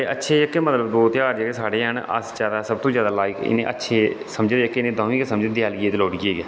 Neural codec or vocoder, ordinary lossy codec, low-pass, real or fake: none; none; none; real